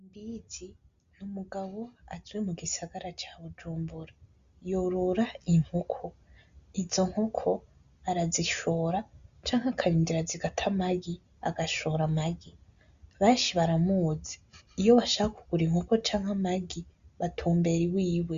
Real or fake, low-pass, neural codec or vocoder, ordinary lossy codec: real; 7.2 kHz; none; Opus, 64 kbps